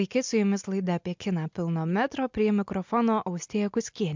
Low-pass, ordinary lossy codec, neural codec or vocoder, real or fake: 7.2 kHz; MP3, 64 kbps; none; real